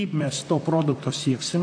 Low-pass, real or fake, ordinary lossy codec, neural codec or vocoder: 9.9 kHz; fake; AAC, 48 kbps; vocoder, 44.1 kHz, 128 mel bands, Pupu-Vocoder